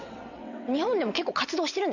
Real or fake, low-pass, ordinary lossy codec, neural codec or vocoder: fake; 7.2 kHz; none; vocoder, 22.05 kHz, 80 mel bands, WaveNeXt